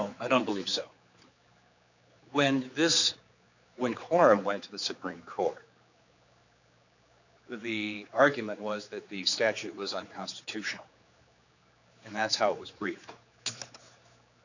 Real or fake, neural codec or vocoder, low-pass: fake; codec, 16 kHz, 4 kbps, X-Codec, HuBERT features, trained on general audio; 7.2 kHz